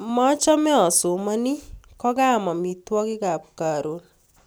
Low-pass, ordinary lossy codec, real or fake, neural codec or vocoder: none; none; real; none